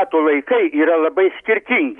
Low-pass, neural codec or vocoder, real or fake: 10.8 kHz; none; real